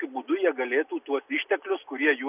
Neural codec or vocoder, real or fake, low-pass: none; real; 3.6 kHz